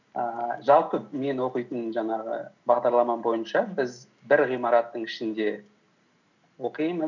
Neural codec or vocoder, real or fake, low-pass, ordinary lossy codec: none; real; 7.2 kHz; none